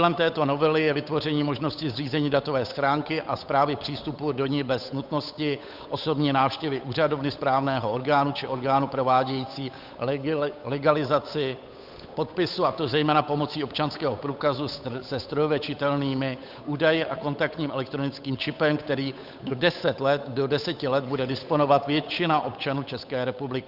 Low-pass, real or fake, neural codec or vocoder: 5.4 kHz; fake; codec, 16 kHz, 8 kbps, FunCodec, trained on Chinese and English, 25 frames a second